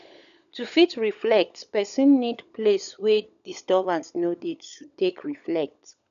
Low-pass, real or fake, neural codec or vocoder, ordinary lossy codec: 7.2 kHz; fake; codec, 16 kHz, 16 kbps, FunCodec, trained on LibriTTS, 50 frames a second; none